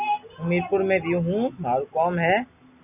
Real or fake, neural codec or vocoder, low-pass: real; none; 3.6 kHz